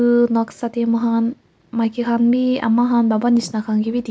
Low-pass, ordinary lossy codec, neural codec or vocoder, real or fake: none; none; none; real